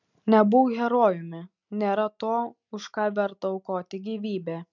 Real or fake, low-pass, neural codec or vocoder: real; 7.2 kHz; none